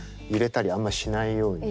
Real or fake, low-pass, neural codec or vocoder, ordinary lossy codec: real; none; none; none